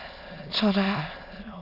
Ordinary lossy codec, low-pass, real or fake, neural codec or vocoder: AAC, 48 kbps; 5.4 kHz; fake; autoencoder, 22.05 kHz, a latent of 192 numbers a frame, VITS, trained on many speakers